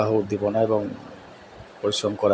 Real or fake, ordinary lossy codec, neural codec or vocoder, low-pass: real; none; none; none